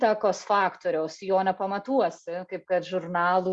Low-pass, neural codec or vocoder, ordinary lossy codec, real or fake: 10.8 kHz; none; Opus, 64 kbps; real